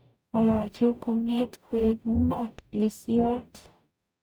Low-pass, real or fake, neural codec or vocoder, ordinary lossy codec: none; fake; codec, 44.1 kHz, 0.9 kbps, DAC; none